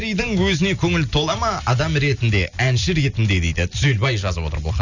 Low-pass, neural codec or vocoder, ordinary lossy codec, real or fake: 7.2 kHz; none; none; real